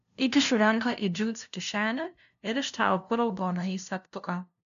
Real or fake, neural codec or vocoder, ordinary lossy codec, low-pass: fake; codec, 16 kHz, 0.5 kbps, FunCodec, trained on LibriTTS, 25 frames a second; MP3, 96 kbps; 7.2 kHz